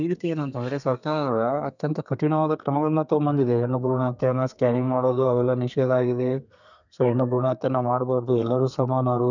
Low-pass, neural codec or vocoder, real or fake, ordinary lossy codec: 7.2 kHz; codec, 32 kHz, 1.9 kbps, SNAC; fake; none